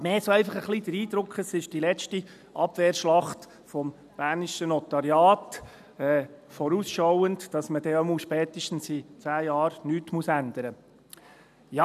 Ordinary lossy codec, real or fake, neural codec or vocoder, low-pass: none; real; none; 14.4 kHz